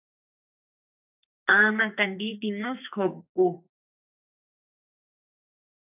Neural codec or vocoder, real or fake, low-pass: codec, 44.1 kHz, 2.6 kbps, SNAC; fake; 3.6 kHz